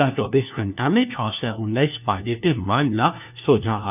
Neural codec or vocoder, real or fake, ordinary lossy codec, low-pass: codec, 16 kHz, 1 kbps, FunCodec, trained on LibriTTS, 50 frames a second; fake; none; 3.6 kHz